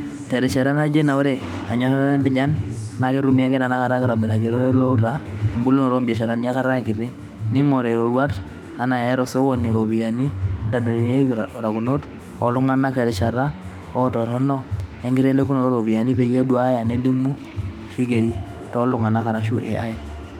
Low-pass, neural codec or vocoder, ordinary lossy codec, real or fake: 19.8 kHz; autoencoder, 48 kHz, 32 numbers a frame, DAC-VAE, trained on Japanese speech; none; fake